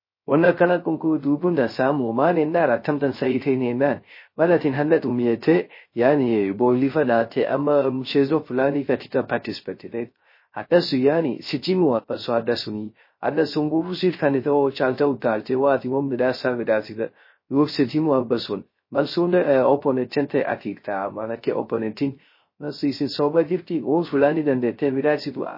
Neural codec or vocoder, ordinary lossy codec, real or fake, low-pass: codec, 16 kHz, 0.3 kbps, FocalCodec; MP3, 24 kbps; fake; 5.4 kHz